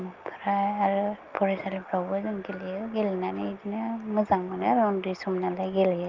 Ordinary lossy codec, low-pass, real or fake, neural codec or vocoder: Opus, 32 kbps; 7.2 kHz; real; none